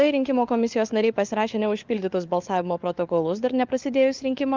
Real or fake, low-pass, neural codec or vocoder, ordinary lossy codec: fake; 7.2 kHz; codec, 16 kHz, 4 kbps, FunCodec, trained on LibriTTS, 50 frames a second; Opus, 32 kbps